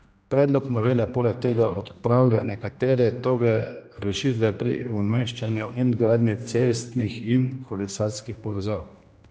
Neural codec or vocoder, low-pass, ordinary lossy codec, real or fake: codec, 16 kHz, 1 kbps, X-Codec, HuBERT features, trained on general audio; none; none; fake